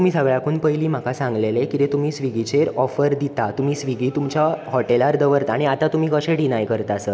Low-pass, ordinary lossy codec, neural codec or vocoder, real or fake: none; none; none; real